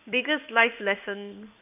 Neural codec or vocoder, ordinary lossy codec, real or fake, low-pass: codec, 16 kHz in and 24 kHz out, 1 kbps, XY-Tokenizer; none; fake; 3.6 kHz